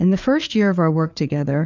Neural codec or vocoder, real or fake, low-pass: codec, 16 kHz, 4 kbps, FreqCodec, larger model; fake; 7.2 kHz